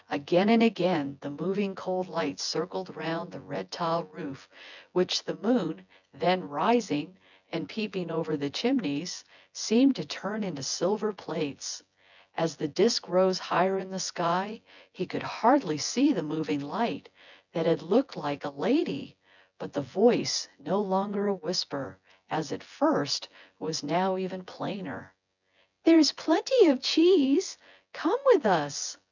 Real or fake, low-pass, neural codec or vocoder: fake; 7.2 kHz; vocoder, 24 kHz, 100 mel bands, Vocos